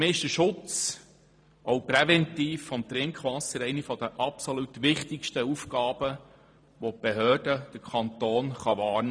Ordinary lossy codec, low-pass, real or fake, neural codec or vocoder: none; 9.9 kHz; fake; vocoder, 44.1 kHz, 128 mel bands every 512 samples, BigVGAN v2